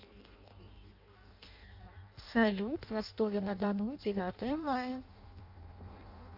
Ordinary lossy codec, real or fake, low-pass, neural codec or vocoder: AAC, 48 kbps; fake; 5.4 kHz; codec, 16 kHz in and 24 kHz out, 0.6 kbps, FireRedTTS-2 codec